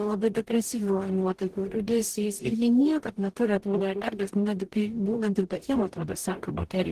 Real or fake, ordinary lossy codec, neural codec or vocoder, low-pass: fake; Opus, 16 kbps; codec, 44.1 kHz, 0.9 kbps, DAC; 14.4 kHz